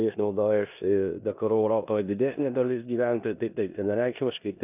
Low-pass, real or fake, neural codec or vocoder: 3.6 kHz; fake; codec, 16 kHz in and 24 kHz out, 0.9 kbps, LongCat-Audio-Codec, four codebook decoder